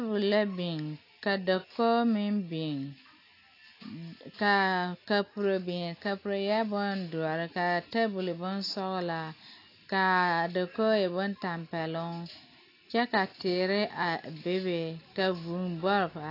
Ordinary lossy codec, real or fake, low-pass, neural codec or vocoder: AAC, 32 kbps; real; 5.4 kHz; none